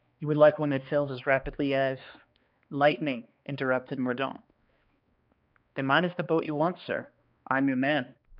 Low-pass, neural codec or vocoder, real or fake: 5.4 kHz; codec, 16 kHz, 2 kbps, X-Codec, HuBERT features, trained on balanced general audio; fake